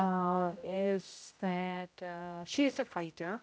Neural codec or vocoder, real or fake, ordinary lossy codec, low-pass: codec, 16 kHz, 0.5 kbps, X-Codec, HuBERT features, trained on general audio; fake; none; none